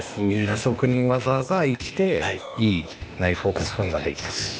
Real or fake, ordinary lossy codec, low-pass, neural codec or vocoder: fake; none; none; codec, 16 kHz, 0.8 kbps, ZipCodec